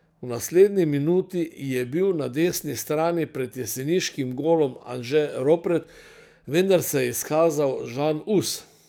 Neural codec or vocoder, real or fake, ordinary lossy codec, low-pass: codec, 44.1 kHz, 7.8 kbps, DAC; fake; none; none